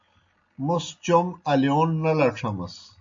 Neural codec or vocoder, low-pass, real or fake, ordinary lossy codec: none; 7.2 kHz; real; MP3, 48 kbps